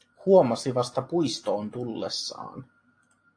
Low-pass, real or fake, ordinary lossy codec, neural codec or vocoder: 9.9 kHz; real; AAC, 48 kbps; none